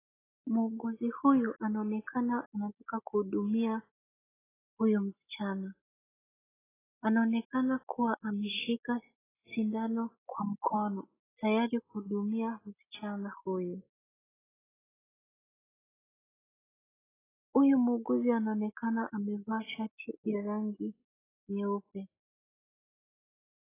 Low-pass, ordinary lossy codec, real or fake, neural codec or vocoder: 3.6 kHz; AAC, 16 kbps; real; none